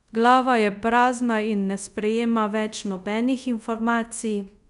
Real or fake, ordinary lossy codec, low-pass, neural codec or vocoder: fake; none; 10.8 kHz; codec, 24 kHz, 0.5 kbps, DualCodec